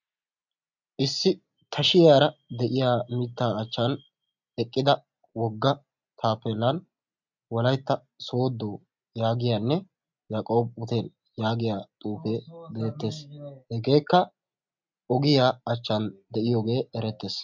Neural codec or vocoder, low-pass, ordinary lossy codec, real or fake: none; 7.2 kHz; MP3, 64 kbps; real